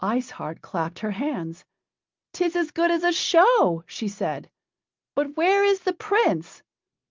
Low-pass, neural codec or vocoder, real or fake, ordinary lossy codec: 7.2 kHz; none; real; Opus, 32 kbps